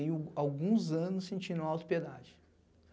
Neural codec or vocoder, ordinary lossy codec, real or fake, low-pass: none; none; real; none